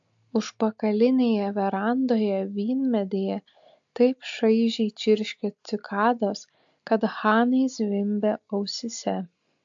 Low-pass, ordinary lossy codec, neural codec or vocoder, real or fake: 7.2 kHz; AAC, 64 kbps; none; real